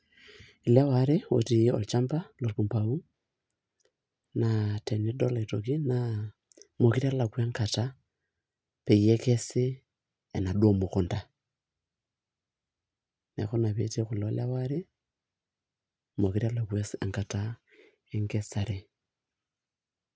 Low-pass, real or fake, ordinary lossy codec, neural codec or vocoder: none; real; none; none